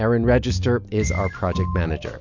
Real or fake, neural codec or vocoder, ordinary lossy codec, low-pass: real; none; MP3, 64 kbps; 7.2 kHz